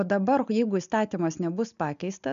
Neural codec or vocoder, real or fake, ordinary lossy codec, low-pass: none; real; MP3, 96 kbps; 7.2 kHz